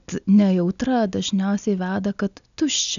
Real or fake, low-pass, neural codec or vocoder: real; 7.2 kHz; none